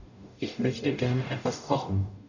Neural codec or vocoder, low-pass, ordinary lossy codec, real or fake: codec, 44.1 kHz, 0.9 kbps, DAC; 7.2 kHz; none; fake